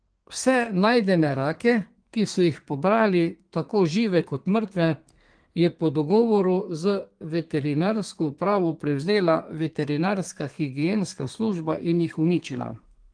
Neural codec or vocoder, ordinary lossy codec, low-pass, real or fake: codec, 32 kHz, 1.9 kbps, SNAC; Opus, 24 kbps; 9.9 kHz; fake